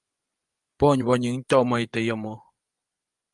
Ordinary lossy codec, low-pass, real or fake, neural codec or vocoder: Opus, 32 kbps; 10.8 kHz; fake; vocoder, 24 kHz, 100 mel bands, Vocos